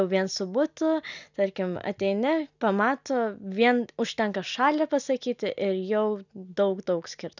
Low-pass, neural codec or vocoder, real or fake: 7.2 kHz; none; real